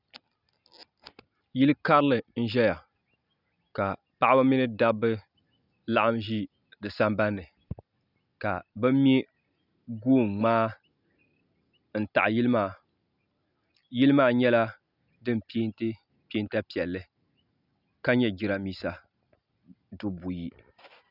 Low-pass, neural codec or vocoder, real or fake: 5.4 kHz; none; real